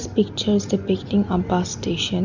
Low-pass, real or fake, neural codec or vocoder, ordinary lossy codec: 7.2 kHz; real; none; none